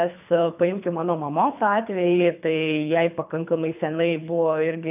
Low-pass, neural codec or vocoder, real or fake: 3.6 kHz; codec, 24 kHz, 3 kbps, HILCodec; fake